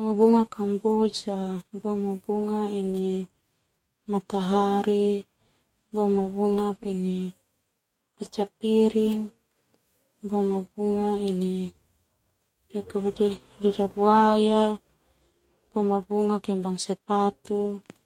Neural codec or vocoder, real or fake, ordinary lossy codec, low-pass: codec, 44.1 kHz, 2.6 kbps, DAC; fake; MP3, 64 kbps; 19.8 kHz